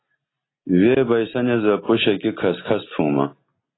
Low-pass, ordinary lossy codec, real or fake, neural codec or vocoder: 7.2 kHz; AAC, 16 kbps; real; none